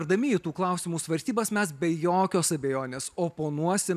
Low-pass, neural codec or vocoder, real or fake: 14.4 kHz; none; real